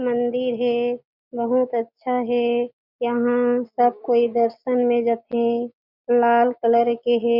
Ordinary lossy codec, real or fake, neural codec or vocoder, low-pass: Opus, 64 kbps; real; none; 5.4 kHz